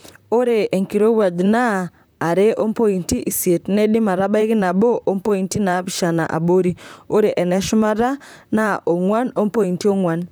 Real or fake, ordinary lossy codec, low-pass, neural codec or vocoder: fake; none; none; vocoder, 44.1 kHz, 128 mel bands, Pupu-Vocoder